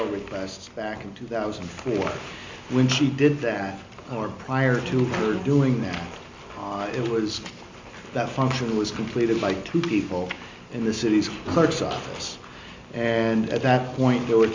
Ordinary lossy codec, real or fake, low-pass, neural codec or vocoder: MP3, 64 kbps; real; 7.2 kHz; none